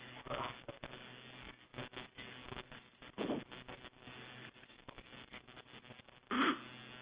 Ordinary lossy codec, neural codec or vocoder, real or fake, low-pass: Opus, 32 kbps; none; real; 3.6 kHz